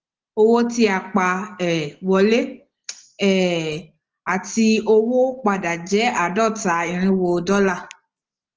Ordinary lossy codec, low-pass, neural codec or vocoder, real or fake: Opus, 16 kbps; 7.2 kHz; none; real